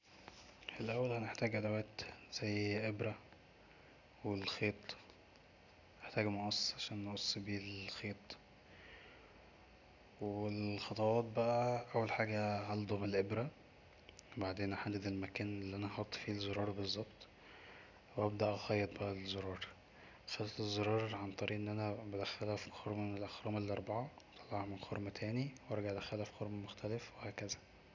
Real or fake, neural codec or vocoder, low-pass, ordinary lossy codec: real; none; 7.2 kHz; none